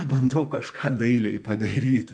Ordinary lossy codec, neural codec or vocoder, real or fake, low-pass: MP3, 96 kbps; codec, 44.1 kHz, 2.6 kbps, SNAC; fake; 9.9 kHz